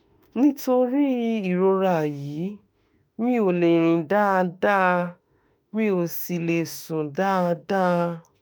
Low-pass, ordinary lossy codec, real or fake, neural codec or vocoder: none; none; fake; autoencoder, 48 kHz, 32 numbers a frame, DAC-VAE, trained on Japanese speech